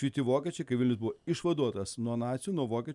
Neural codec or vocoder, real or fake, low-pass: none; real; 10.8 kHz